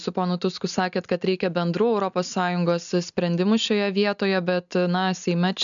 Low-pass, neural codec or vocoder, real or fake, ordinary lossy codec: 7.2 kHz; none; real; MP3, 96 kbps